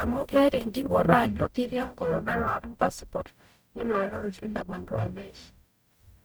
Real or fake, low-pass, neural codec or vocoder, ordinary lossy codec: fake; none; codec, 44.1 kHz, 0.9 kbps, DAC; none